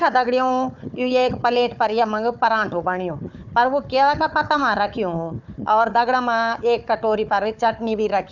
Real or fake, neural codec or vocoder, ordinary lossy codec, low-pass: fake; codec, 16 kHz, 4 kbps, FunCodec, trained on Chinese and English, 50 frames a second; none; 7.2 kHz